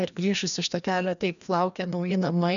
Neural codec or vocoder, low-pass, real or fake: codec, 16 kHz, 1 kbps, FreqCodec, larger model; 7.2 kHz; fake